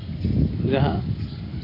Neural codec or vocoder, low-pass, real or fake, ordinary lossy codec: none; 5.4 kHz; real; AAC, 32 kbps